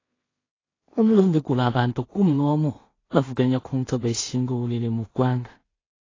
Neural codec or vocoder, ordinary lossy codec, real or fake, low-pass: codec, 16 kHz in and 24 kHz out, 0.4 kbps, LongCat-Audio-Codec, two codebook decoder; AAC, 32 kbps; fake; 7.2 kHz